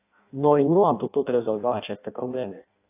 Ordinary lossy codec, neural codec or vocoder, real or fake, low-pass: AAC, 24 kbps; codec, 16 kHz in and 24 kHz out, 0.6 kbps, FireRedTTS-2 codec; fake; 3.6 kHz